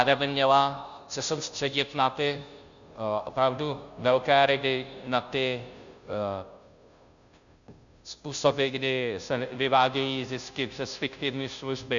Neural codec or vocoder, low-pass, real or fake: codec, 16 kHz, 0.5 kbps, FunCodec, trained on Chinese and English, 25 frames a second; 7.2 kHz; fake